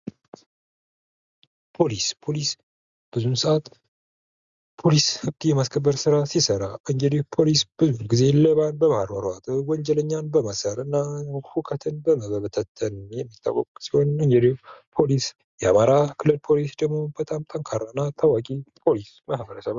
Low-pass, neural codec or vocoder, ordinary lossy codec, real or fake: 7.2 kHz; none; Opus, 64 kbps; real